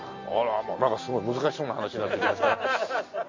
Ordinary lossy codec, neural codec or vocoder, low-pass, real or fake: MP3, 32 kbps; none; 7.2 kHz; real